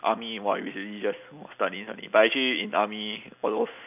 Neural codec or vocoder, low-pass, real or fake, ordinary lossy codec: none; 3.6 kHz; real; none